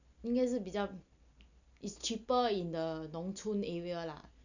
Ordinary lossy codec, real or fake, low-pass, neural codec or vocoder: none; real; 7.2 kHz; none